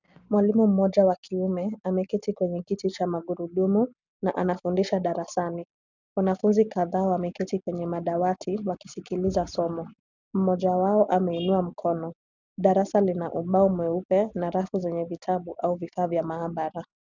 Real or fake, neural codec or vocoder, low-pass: real; none; 7.2 kHz